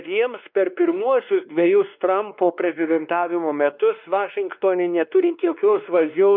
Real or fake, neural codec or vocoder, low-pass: fake; codec, 16 kHz, 2 kbps, X-Codec, WavLM features, trained on Multilingual LibriSpeech; 5.4 kHz